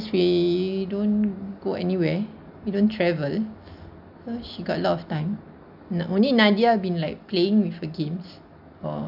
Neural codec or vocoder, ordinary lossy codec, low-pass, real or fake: none; none; 5.4 kHz; real